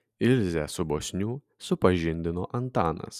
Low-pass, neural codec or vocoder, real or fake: 14.4 kHz; none; real